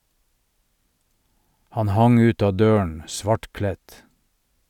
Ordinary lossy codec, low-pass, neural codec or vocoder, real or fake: none; 19.8 kHz; none; real